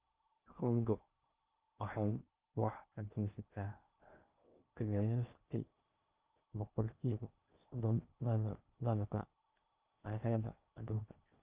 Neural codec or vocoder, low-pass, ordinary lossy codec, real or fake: codec, 16 kHz in and 24 kHz out, 0.6 kbps, FocalCodec, streaming, 2048 codes; 3.6 kHz; Opus, 24 kbps; fake